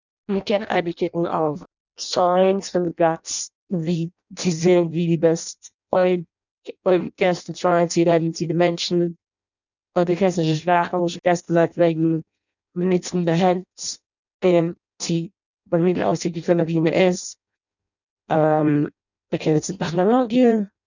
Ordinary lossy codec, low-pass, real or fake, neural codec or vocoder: none; 7.2 kHz; fake; codec, 16 kHz in and 24 kHz out, 0.6 kbps, FireRedTTS-2 codec